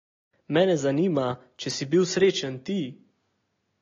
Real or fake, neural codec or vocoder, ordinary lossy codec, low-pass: real; none; AAC, 32 kbps; 7.2 kHz